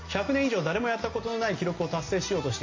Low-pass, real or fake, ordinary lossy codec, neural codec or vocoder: 7.2 kHz; real; MP3, 32 kbps; none